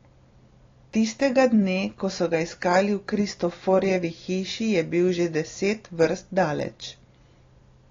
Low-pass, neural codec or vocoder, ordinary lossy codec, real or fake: 7.2 kHz; none; AAC, 32 kbps; real